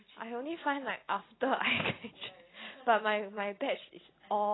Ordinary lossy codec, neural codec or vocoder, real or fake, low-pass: AAC, 16 kbps; none; real; 7.2 kHz